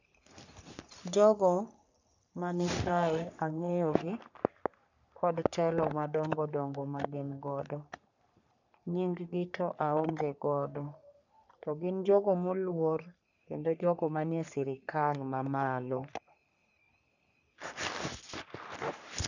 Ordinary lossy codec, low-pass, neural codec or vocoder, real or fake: none; 7.2 kHz; codec, 44.1 kHz, 3.4 kbps, Pupu-Codec; fake